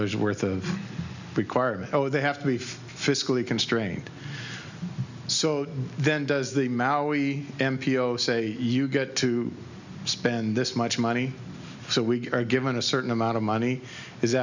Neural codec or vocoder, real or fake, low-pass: none; real; 7.2 kHz